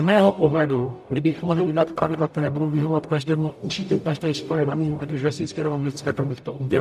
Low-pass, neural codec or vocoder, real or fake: 14.4 kHz; codec, 44.1 kHz, 0.9 kbps, DAC; fake